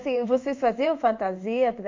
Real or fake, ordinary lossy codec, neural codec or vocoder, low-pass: fake; MP3, 48 kbps; codec, 16 kHz in and 24 kHz out, 1 kbps, XY-Tokenizer; 7.2 kHz